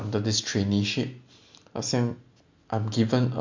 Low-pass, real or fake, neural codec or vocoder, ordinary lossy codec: 7.2 kHz; real; none; MP3, 64 kbps